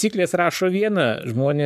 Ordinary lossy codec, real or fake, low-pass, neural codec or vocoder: MP3, 96 kbps; fake; 14.4 kHz; codec, 44.1 kHz, 7.8 kbps, Pupu-Codec